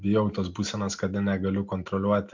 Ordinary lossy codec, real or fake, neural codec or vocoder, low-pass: MP3, 64 kbps; real; none; 7.2 kHz